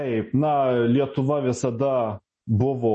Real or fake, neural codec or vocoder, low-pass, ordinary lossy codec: real; none; 10.8 kHz; MP3, 32 kbps